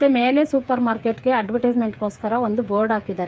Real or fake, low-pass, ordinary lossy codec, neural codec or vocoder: fake; none; none; codec, 16 kHz, 8 kbps, FreqCodec, smaller model